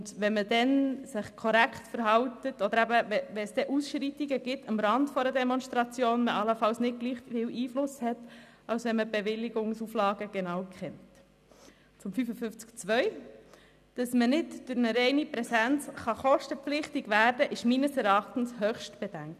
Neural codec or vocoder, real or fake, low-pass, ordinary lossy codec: none; real; 14.4 kHz; none